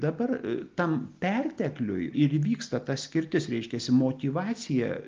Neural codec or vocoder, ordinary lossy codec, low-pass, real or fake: none; Opus, 24 kbps; 7.2 kHz; real